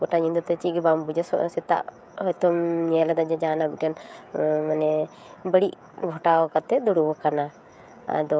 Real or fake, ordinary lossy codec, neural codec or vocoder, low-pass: fake; none; codec, 16 kHz, 16 kbps, FreqCodec, smaller model; none